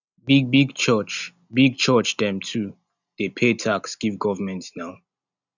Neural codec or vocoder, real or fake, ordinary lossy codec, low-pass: none; real; none; 7.2 kHz